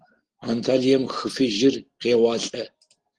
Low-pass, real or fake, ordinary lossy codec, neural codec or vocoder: 10.8 kHz; real; Opus, 16 kbps; none